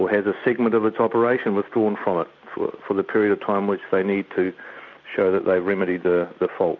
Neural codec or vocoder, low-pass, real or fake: none; 7.2 kHz; real